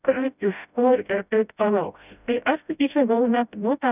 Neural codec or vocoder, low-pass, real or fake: codec, 16 kHz, 0.5 kbps, FreqCodec, smaller model; 3.6 kHz; fake